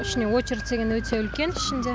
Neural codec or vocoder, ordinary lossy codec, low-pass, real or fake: none; none; none; real